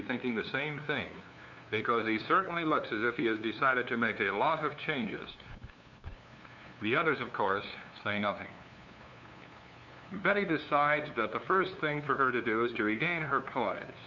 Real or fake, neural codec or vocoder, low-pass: fake; codec, 16 kHz, 2 kbps, FunCodec, trained on LibriTTS, 25 frames a second; 7.2 kHz